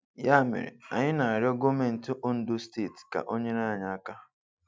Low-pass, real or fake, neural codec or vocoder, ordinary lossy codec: none; real; none; none